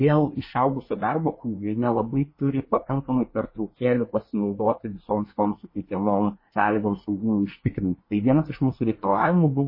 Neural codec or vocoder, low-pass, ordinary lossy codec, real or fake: codec, 24 kHz, 1 kbps, SNAC; 5.4 kHz; MP3, 24 kbps; fake